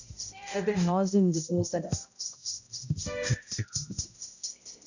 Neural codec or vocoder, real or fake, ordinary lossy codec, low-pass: codec, 16 kHz, 0.5 kbps, X-Codec, HuBERT features, trained on balanced general audio; fake; none; 7.2 kHz